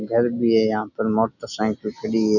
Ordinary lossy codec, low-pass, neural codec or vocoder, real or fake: none; 7.2 kHz; none; real